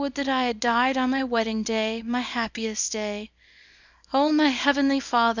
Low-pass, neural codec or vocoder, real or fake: 7.2 kHz; codec, 24 kHz, 0.9 kbps, WavTokenizer, small release; fake